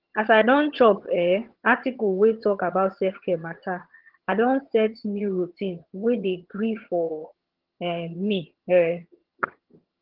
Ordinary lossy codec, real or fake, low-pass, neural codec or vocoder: Opus, 16 kbps; fake; 5.4 kHz; vocoder, 22.05 kHz, 80 mel bands, HiFi-GAN